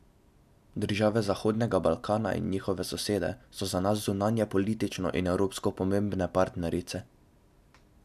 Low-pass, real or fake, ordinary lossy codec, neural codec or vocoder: 14.4 kHz; real; none; none